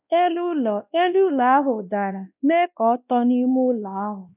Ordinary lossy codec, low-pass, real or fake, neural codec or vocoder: none; 3.6 kHz; fake; codec, 16 kHz, 1 kbps, X-Codec, WavLM features, trained on Multilingual LibriSpeech